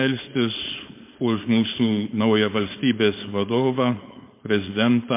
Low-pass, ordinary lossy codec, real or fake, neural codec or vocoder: 3.6 kHz; MP3, 24 kbps; fake; codec, 16 kHz, 4.8 kbps, FACodec